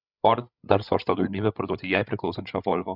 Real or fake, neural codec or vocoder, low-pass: fake; codec, 16 kHz, 16 kbps, FreqCodec, larger model; 5.4 kHz